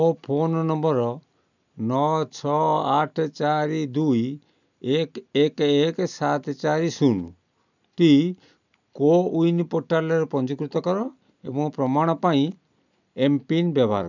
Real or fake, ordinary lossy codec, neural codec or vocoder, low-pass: real; none; none; 7.2 kHz